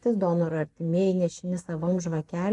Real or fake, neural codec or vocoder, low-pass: fake; vocoder, 44.1 kHz, 128 mel bands every 512 samples, BigVGAN v2; 10.8 kHz